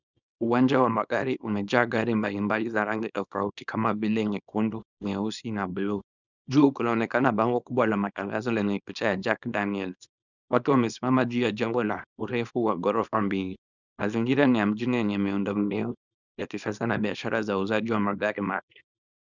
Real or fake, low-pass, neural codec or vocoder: fake; 7.2 kHz; codec, 24 kHz, 0.9 kbps, WavTokenizer, small release